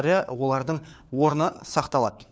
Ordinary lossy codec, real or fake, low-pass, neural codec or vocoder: none; fake; none; codec, 16 kHz, 4 kbps, FunCodec, trained on LibriTTS, 50 frames a second